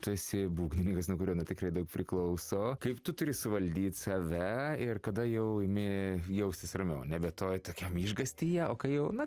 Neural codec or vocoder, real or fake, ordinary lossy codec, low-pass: none; real; Opus, 32 kbps; 14.4 kHz